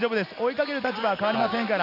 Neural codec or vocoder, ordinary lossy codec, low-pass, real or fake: none; none; 5.4 kHz; real